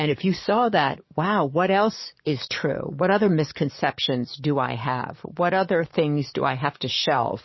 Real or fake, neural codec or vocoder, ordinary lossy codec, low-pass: fake; codec, 44.1 kHz, 7.8 kbps, DAC; MP3, 24 kbps; 7.2 kHz